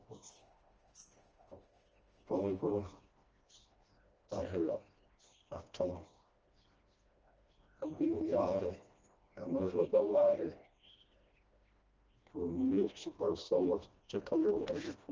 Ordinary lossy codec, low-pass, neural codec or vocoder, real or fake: Opus, 24 kbps; 7.2 kHz; codec, 16 kHz, 1 kbps, FreqCodec, smaller model; fake